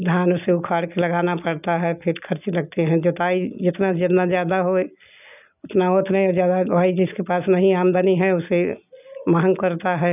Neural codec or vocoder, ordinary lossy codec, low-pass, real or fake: none; none; 3.6 kHz; real